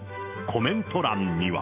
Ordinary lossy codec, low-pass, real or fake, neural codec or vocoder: none; 3.6 kHz; fake; autoencoder, 48 kHz, 128 numbers a frame, DAC-VAE, trained on Japanese speech